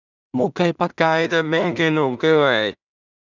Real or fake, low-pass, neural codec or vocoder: fake; 7.2 kHz; codec, 16 kHz in and 24 kHz out, 0.4 kbps, LongCat-Audio-Codec, two codebook decoder